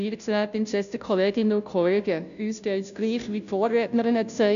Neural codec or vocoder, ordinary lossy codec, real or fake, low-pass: codec, 16 kHz, 0.5 kbps, FunCodec, trained on Chinese and English, 25 frames a second; none; fake; 7.2 kHz